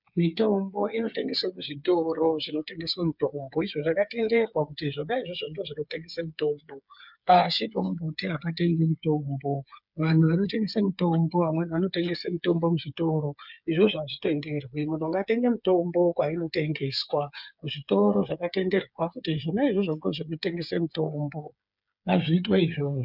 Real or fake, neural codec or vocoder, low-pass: fake; codec, 16 kHz, 8 kbps, FreqCodec, smaller model; 5.4 kHz